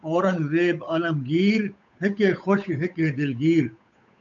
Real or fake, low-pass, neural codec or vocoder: fake; 7.2 kHz; codec, 16 kHz, 8 kbps, FunCodec, trained on Chinese and English, 25 frames a second